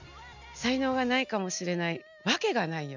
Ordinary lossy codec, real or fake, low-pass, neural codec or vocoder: none; real; 7.2 kHz; none